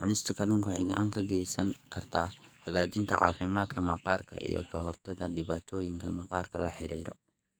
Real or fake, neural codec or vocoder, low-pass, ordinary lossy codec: fake; codec, 44.1 kHz, 2.6 kbps, SNAC; none; none